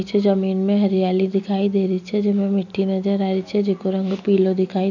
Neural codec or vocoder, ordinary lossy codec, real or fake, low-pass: none; none; real; 7.2 kHz